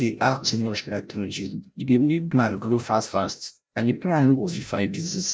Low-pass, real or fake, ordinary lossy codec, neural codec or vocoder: none; fake; none; codec, 16 kHz, 0.5 kbps, FreqCodec, larger model